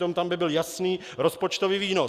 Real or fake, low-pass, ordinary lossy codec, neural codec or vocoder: real; 14.4 kHz; Opus, 64 kbps; none